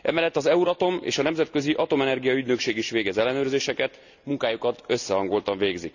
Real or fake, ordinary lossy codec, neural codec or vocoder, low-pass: real; none; none; 7.2 kHz